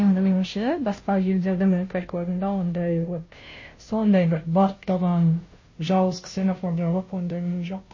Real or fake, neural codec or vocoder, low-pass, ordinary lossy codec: fake; codec, 16 kHz, 0.5 kbps, FunCodec, trained on Chinese and English, 25 frames a second; 7.2 kHz; MP3, 32 kbps